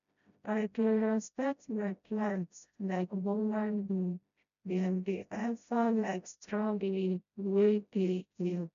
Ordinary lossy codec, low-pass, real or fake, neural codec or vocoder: none; 7.2 kHz; fake; codec, 16 kHz, 0.5 kbps, FreqCodec, smaller model